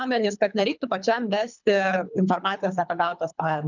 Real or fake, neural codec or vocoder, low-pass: fake; codec, 24 kHz, 3 kbps, HILCodec; 7.2 kHz